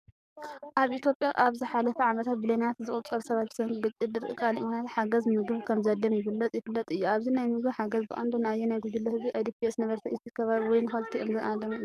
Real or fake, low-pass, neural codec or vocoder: fake; 14.4 kHz; codec, 44.1 kHz, 7.8 kbps, DAC